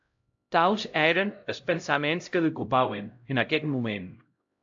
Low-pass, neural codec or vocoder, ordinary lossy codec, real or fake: 7.2 kHz; codec, 16 kHz, 0.5 kbps, X-Codec, HuBERT features, trained on LibriSpeech; AAC, 64 kbps; fake